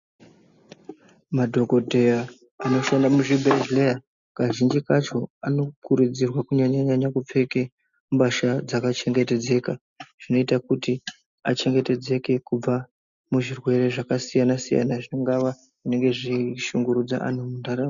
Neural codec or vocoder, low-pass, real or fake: none; 7.2 kHz; real